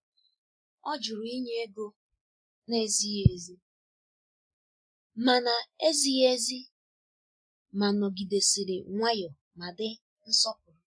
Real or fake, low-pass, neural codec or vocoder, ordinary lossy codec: real; 9.9 kHz; none; MP3, 64 kbps